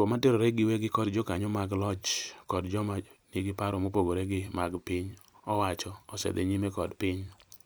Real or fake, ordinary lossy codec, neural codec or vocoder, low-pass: fake; none; vocoder, 44.1 kHz, 128 mel bands every 256 samples, BigVGAN v2; none